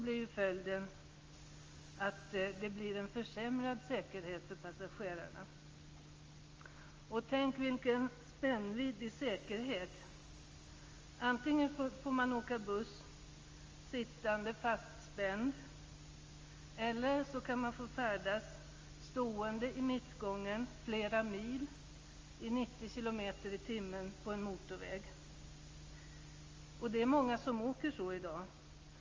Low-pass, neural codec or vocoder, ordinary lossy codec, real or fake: 7.2 kHz; none; Opus, 32 kbps; real